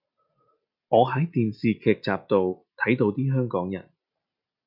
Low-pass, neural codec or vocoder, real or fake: 5.4 kHz; none; real